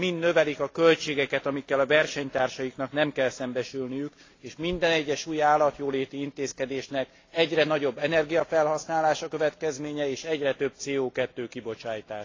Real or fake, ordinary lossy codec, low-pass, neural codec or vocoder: real; AAC, 32 kbps; 7.2 kHz; none